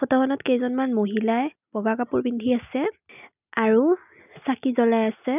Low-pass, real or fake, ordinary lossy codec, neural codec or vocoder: 3.6 kHz; real; none; none